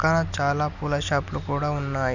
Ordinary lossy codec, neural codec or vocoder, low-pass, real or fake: none; none; 7.2 kHz; real